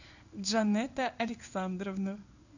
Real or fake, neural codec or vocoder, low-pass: fake; codec, 16 kHz in and 24 kHz out, 1 kbps, XY-Tokenizer; 7.2 kHz